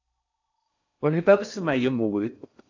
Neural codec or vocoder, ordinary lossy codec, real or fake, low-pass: codec, 16 kHz in and 24 kHz out, 0.6 kbps, FocalCodec, streaming, 4096 codes; AAC, 48 kbps; fake; 7.2 kHz